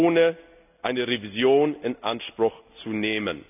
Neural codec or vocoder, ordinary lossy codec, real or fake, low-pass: none; none; real; 3.6 kHz